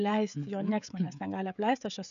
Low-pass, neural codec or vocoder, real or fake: 7.2 kHz; codec, 16 kHz, 16 kbps, FreqCodec, smaller model; fake